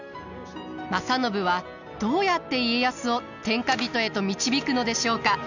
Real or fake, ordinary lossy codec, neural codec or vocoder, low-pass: real; none; none; 7.2 kHz